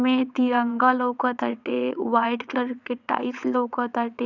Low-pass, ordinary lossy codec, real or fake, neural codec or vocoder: 7.2 kHz; none; fake; vocoder, 22.05 kHz, 80 mel bands, WaveNeXt